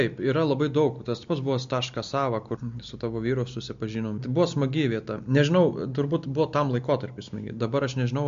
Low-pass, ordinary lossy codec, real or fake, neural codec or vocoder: 7.2 kHz; MP3, 48 kbps; real; none